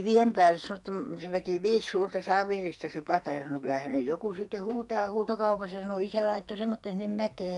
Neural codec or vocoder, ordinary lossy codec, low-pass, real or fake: codec, 44.1 kHz, 2.6 kbps, SNAC; none; 10.8 kHz; fake